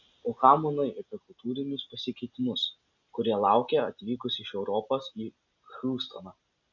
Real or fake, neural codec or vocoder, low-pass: real; none; 7.2 kHz